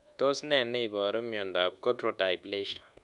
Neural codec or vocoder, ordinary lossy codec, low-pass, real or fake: codec, 24 kHz, 1.2 kbps, DualCodec; none; 10.8 kHz; fake